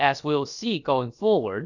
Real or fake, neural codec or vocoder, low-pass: fake; codec, 16 kHz, about 1 kbps, DyCAST, with the encoder's durations; 7.2 kHz